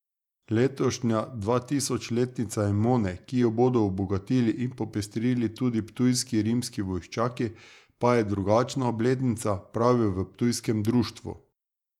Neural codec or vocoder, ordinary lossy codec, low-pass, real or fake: vocoder, 48 kHz, 128 mel bands, Vocos; none; 19.8 kHz; fake